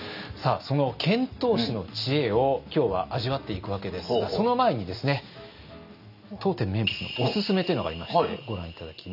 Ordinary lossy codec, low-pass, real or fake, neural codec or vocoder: none; 5.4 kHz; real; none